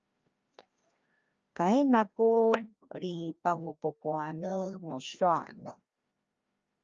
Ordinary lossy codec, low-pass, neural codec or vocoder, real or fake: Opus, 24 kbps; 7.2 kHz; codec, 16 kHz, 1 kbps, FreqCodec, larger model; fake